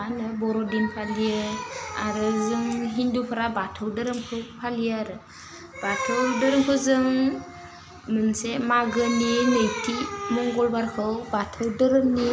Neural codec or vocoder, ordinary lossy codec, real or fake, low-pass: none; none; real; none